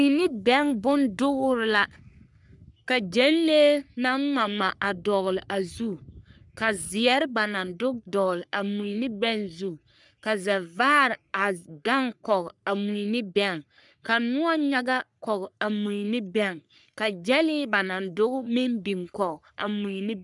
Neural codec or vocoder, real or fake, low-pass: codec, 44.1 kHz, 3.4 kbps, Pupu-Codec; fake; 10.8 kHz